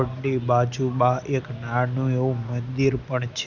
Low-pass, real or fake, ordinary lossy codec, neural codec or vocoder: 7.2 kHz; real; none; none